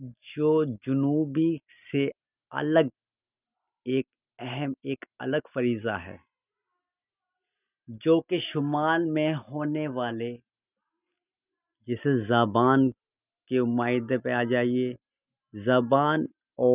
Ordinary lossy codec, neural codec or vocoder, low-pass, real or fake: none; none; 3.6 kHz; real